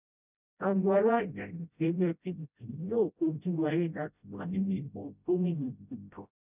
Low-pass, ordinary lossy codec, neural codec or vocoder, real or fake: 3.6 kHz; none; codec, 16 kHz, 0.5 kbps, FreqCodec, smaller model; fake